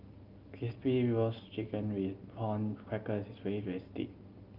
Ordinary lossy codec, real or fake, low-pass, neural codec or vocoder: Opus, 32 kbps; real; 5.4 kHz; none